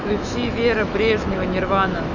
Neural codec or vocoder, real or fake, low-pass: autoencoder, 48 kHz, 128 numbers a frame, DAC-VAE, trained on Japanese speech; fake; 7.2 kHz